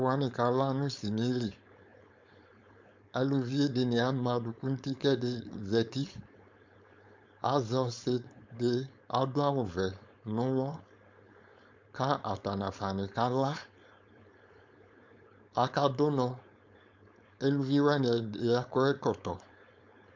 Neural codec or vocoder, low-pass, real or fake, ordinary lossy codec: codec, 16 kHz, 4.8 kbps, FACodec; 7.2 kHz; fake; MP3, 64 kbps